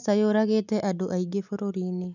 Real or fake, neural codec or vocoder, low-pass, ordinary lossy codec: real; none; 7.2 kHz; none